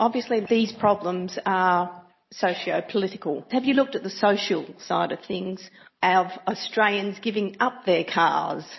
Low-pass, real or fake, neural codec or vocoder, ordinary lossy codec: 7.2 kHz; real; none; MP3, 24 kbps